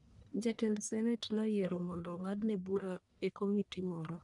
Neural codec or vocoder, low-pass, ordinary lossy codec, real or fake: codec, 44.1 kHz, 1.7 kbps, Pupu-Codec; 10.8 kHz; none; fake